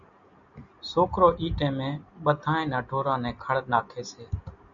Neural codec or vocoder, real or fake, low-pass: none; real; 7.2 kHz